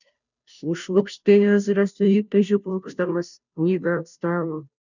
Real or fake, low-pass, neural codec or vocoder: fake; 7.2 kHz; codec, 16 kHz, 0.5 kbps, FunCodec, trained on Chinese and English, 25 frames a second